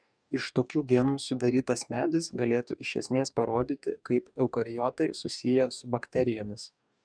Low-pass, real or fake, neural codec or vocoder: 9.9 kHz; fake; codec, 44.1 kHz, 2.6 kbps, DAC